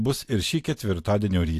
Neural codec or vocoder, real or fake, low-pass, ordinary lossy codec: vocoder, 44.1 kHz, 128 mel bands every 256 samples, BigVGAN v2; fake; 14.4 kHz; AAC, 64 kbps